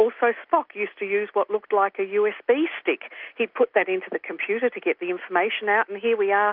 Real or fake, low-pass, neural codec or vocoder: real; 5.4 kHz; none